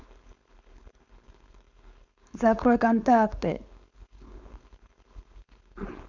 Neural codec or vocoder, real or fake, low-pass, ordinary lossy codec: codec, 16 kHz, 4.8 kbps, FACodec; fake; 7.2 kHz; none